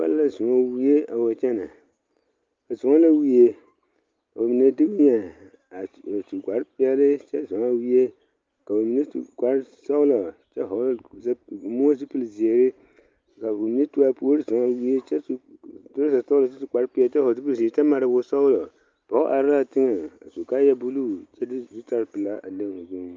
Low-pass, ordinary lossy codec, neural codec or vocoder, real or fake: 7.2 kHz; Opus, 24 kbps; none; real